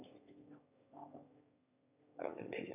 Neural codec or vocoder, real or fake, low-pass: autoencoder, 22.05 kHz, a latent of 192 numbers a frame, VITS, trained on one speaker; fake; 3.6 kHz